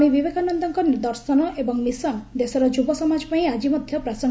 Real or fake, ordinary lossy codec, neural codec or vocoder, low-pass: real; none; none; none